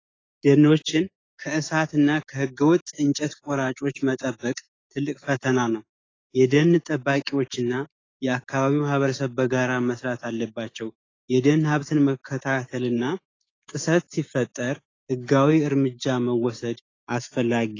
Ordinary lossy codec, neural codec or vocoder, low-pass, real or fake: AAC, 32 kbps; codec, 16 kHz, 6 kbps, DAC; 7.2 kHz; fake